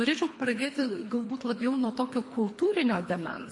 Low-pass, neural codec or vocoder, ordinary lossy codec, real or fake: 10.8 kHz; codec, 24 kHz, 3 kbps, HILCodec; MP3, 48 kbps; fake